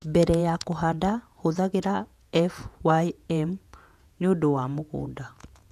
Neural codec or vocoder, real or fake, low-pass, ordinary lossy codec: vocoder, 48 kHz, 128 mel bands, Vocos; fake; 14.4 kHz; none